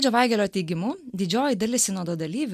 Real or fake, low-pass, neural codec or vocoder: real; 14.4 kHz; none